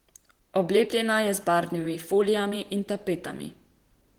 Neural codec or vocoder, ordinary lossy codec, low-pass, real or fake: vocoder, 44.1 kHz, 128 mel bands, Pupu-Vocoder; Opus, 16 kbps; 19.8 kHz; fake